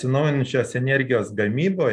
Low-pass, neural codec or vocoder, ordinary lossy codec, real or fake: 9.9 kHz; none; MP3, 96 kbps; real